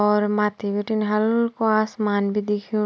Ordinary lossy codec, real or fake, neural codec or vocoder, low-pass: none; real; none; none